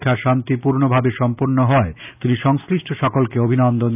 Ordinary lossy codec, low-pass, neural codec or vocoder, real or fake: none; 3.6 kHz; none; real